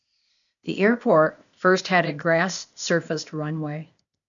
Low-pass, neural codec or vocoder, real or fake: 7.2 kHz; codec, 16 kHz, 0.8 kbps, ZipCodec; fake